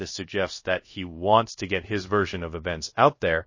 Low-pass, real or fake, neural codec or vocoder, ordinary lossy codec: 7.2 kHz; fake; codec, 24 kHz, 0.5 kbps, DualCodec; MP3, 32 kbps